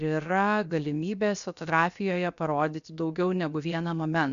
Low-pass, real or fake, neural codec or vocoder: 7.2 kHz; fake; codec, 16 kHz, 0.7 kbps, FocalCodec